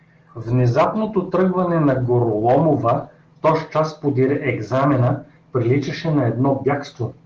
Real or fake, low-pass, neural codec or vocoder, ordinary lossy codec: real; 7.2 kHz; none; Opus, 16 kbps